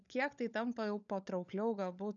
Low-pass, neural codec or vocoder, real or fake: 7.2 kHz; codec, 16 kHz, 16 kbps, FunCodec, trained on LibriTTS, 50 frames a second; fake